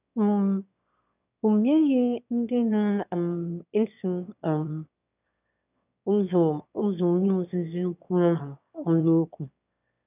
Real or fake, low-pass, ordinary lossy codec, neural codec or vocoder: fake; 3.6 kHz; none; autoencoder, 22.05 kHz, a latent of 192 numbers a frame, VITS, trained on one speaker